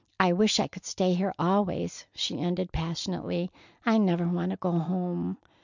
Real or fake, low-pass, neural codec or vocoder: real; 7.2 kHz; none